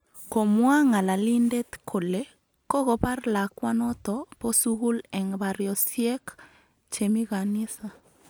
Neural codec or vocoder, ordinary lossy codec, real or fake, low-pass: none; none; real; none